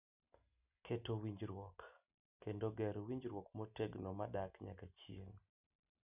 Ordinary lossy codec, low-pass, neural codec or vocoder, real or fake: none; 3.6 kHz; none; real